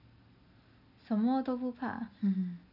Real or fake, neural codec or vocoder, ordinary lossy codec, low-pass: real; none; none; 5.4 kHz